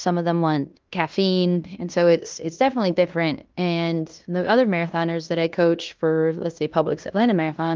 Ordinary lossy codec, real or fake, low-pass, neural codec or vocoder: Opus, 24 kbps; fake; 7.2 kHz; codec, 16 kHz in and 24 kHz out, 0.9 kbps, LongCat-Audio-Codec, fine tuned four codebook decoder